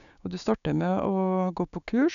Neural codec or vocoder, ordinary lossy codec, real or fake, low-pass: codec, 16 kHz, 6 kbps, DAC; none; fake; 7.2 kHz